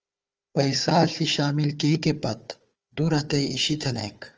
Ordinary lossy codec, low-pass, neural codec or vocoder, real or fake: Opus, 24 kbps; 7.2 kHz; codec, 16 kHz, 4 kbps, FunCodec, trained on Chinese and English, 50 frames a second; fake